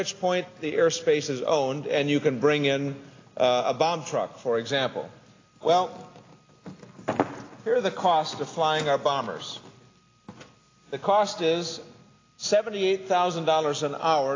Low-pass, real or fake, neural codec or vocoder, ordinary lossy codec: 7.2 kHz; real; none; AAC, 32 kbps